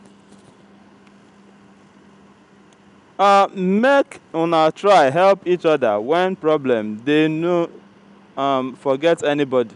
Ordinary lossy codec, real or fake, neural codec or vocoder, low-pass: none; real; none; 10.8 kHz